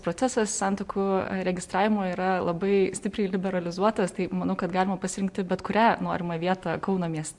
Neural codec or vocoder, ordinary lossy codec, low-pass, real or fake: none; MP3, 64 kbps; 10.8 kHz; real